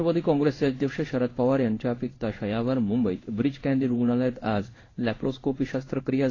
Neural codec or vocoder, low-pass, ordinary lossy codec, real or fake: codec, 16 kHz in and 24 kHz out, 1 kbps, XY-Tokenizer; 7.2 kHz; AAC, 32 kbps; fake